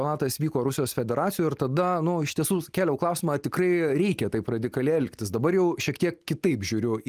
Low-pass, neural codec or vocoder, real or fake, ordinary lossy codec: 14.4 kHz; none; real; Opus, 32 kbps